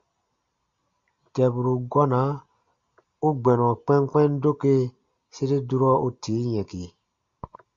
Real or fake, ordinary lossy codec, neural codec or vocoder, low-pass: real; Opus, 64 kbps; none; 7.2 kHz